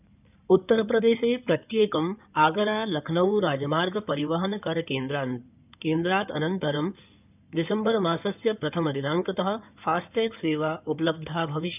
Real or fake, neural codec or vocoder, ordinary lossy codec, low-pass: fake; codec, 16 kHz in and 24 kHz out, 2.2 kbps, FireRedTTS-2 codec; none; 3.6 kHz